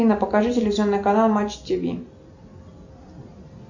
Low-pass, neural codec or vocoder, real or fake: 7.2 kHz; none; real